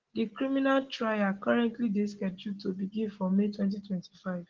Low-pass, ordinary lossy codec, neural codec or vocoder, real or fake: 7.2 kHz; Opus, 16 kbps; none; real